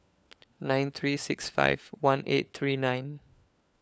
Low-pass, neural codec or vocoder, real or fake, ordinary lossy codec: none; codec, 16 kHz, 4 kbps, FunCodec, trained on LibriTTS, 50 frames a second; fake; none